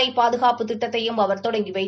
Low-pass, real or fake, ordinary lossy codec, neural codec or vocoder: none; real; none; none